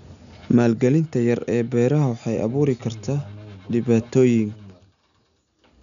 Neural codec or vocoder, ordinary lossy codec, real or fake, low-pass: none; none; real; 7.2 kHz